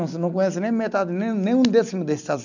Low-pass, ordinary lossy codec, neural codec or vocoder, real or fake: 7.2 kHz; none; none; real